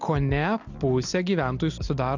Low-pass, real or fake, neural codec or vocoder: 7.2 kHz; real; none